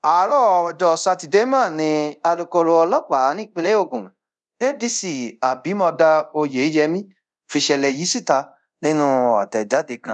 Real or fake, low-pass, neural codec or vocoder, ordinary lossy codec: fake; 10.8 kHz; codec, 24 kHz, 0.5 kbps, DualCodec; none